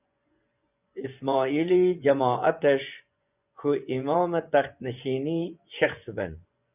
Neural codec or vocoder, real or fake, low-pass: vocoder, 24 kHz, 100 mel bands, Vocos; fake; 3.6 kHz